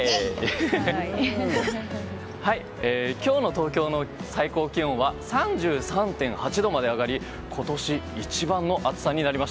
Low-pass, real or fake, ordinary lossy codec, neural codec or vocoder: none; real; none; none